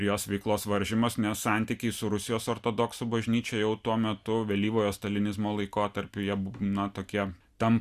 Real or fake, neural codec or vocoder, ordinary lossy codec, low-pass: real; none; Opus, 64 kbps; 14.4 kHz